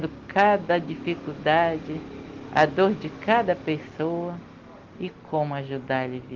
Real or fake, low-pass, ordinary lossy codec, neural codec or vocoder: real; 7.2 kHz; Opus, 16 kbps; none